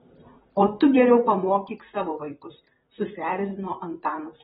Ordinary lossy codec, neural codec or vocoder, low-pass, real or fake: AAC, 16 kbps; vocoder, 44.1 kHz, 128 mel bands, Pupu-Vocoder; 19.8 kHz; fake